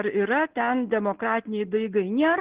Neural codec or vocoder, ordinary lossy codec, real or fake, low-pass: none; Opus, 16 kbps; real; 3.6 kHz